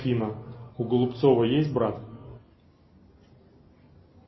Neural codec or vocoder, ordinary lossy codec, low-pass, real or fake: none; MP3, 24 kbps; 7.2 kHz; real